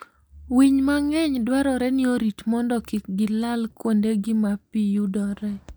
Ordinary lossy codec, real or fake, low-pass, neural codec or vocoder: none; real; none; none